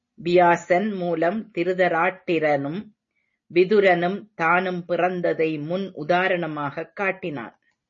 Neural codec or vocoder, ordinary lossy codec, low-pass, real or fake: none; MP3, 32 kbps; 7.2 kHz; real